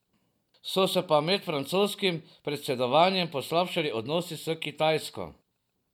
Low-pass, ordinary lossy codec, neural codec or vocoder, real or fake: 19.8 kHz; none; none; real